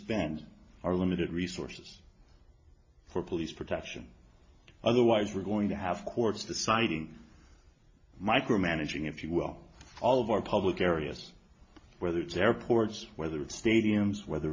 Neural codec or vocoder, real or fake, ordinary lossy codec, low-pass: none; real; MP3, 32 kbps; 7.2 kHz